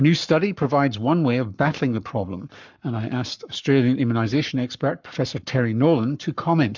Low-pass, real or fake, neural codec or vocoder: 7.2 kHz; fake; codec, 44.1 kHz, 7.8 kbps, Pupu-Codec